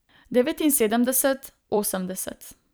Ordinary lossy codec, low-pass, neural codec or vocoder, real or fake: none; none; vocoder, 44.1 kHz, 128 mel bands every 256 samples, BigVGAN v2; fake